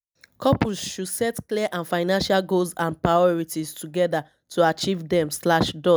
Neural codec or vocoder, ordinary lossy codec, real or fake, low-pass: none; none; real; none